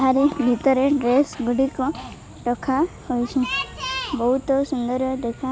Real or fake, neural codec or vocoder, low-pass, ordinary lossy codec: real; none; none; none